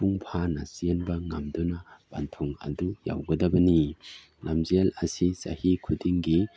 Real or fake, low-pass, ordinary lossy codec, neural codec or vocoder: real; none; none; none